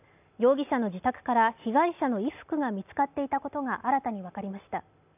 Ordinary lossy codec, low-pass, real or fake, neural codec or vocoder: none; 3.6 kHz; real; none